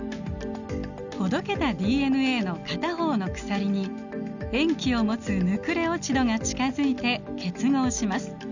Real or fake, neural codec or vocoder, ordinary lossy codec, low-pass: real; none; none; 7.2 kHz